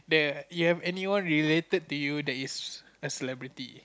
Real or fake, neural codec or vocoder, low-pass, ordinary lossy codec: real; none; none; none